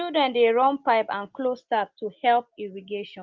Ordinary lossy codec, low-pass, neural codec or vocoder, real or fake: Opus, 32 kbps; 7.2 kHz; none; real